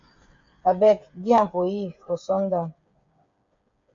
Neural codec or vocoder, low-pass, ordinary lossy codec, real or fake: codec, 16 kHz, 8 kbps, FreqCodec, smaller model; 7.2 kHz; MP3, 48 kbps; fake